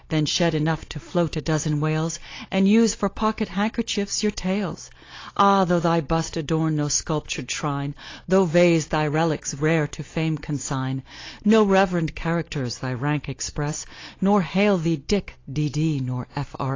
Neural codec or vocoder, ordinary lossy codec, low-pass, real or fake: none; AAC, 32 kbps; 7.2 kHz; real